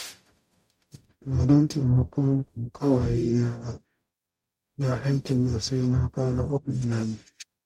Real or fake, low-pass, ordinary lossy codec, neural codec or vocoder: fake; 19.8 kHz; MP3, 64 kbps; codec, 44.1 kHz, 0.9 kbps, DAC